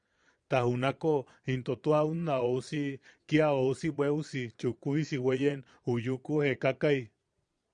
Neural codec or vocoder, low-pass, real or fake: vocoder, 22.05 kHz, 80 mel bands, Vocos; 9.9 kHz; fake